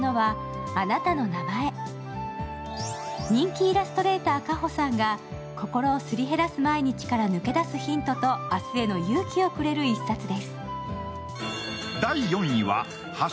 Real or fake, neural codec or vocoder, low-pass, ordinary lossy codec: real; none; none; none